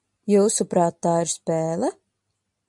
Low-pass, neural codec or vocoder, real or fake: 10.8 kHz; none; real